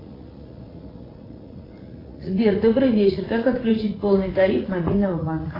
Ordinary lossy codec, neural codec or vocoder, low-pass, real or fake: AAC, 24 kbps; vocoder, 44.1 kHz, 80 mel bands, Vocos; 5.4 kHz; fake